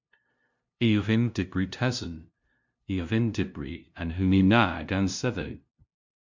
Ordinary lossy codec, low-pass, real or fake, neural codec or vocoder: MP3, 64 kbps; 7.2 kHz; fake; codec, 16 kHz, 0.5 kbps, FunCodec, trained on LibriTTS, 25 frames a second